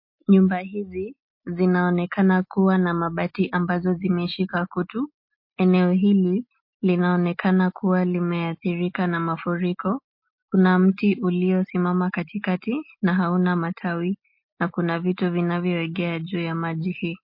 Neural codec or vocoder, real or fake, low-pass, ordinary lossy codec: none; real; 5.4 kHz; MP3, 32 kbps